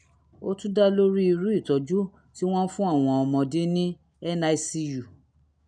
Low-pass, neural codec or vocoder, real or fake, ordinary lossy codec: 9.9 kHz; none; real; none